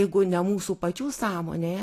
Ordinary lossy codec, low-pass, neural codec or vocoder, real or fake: AAC, 48 kbps; 14.4 kHz; none; real